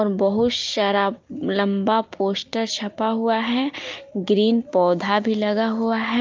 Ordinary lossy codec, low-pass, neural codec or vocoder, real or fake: Opus, 32 kbps; 7.2 kHz; none; real